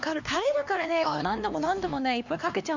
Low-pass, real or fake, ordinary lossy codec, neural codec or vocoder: 7.2 kHz; fake; MP3, 64 kbps; codec, 16 kHz, 2 kbps, X-Codec, HuBERT features, trained on LibriSpeech